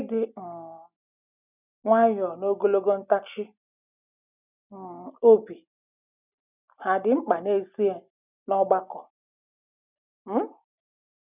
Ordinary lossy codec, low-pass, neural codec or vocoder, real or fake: none; 3.6 kHz; none; real